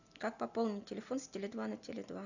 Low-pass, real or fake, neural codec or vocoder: 7.2 kHz; real; none